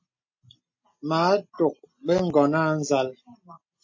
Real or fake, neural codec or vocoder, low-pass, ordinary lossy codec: real; none; 7.2 kHz; MP3, 32 kbps